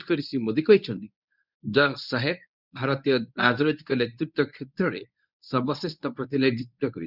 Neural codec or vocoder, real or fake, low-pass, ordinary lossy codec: codec, 24 kHz, 0.9 kbps, WavTokenizer, medium speech release version 1; fake; 5.4 kHz; none